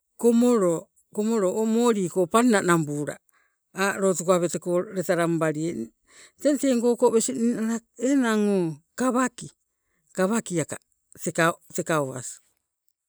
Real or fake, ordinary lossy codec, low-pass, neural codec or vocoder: real; none; none; none